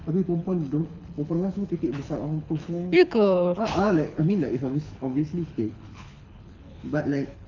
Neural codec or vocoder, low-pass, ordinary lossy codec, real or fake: codec, 24 kHz, 6 kbps, HILCodec; 7.2 kHz; none; fake